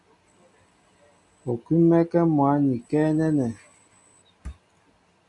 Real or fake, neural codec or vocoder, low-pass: real; none; 10.8 kHz